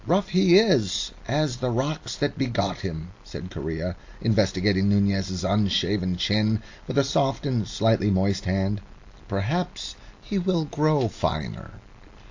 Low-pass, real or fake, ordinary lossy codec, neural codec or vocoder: 7.2 kHz; real; AAC, 48 kbps; none